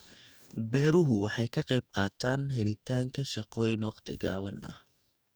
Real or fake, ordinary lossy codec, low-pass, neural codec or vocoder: fake; none; none; codec, 44.1 kHz, 2.6 kbps, DAC